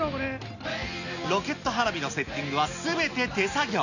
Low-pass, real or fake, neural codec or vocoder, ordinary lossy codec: 7.2 kHz; real; none; none